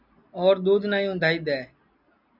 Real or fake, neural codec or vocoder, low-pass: real; none; 5.4 kHz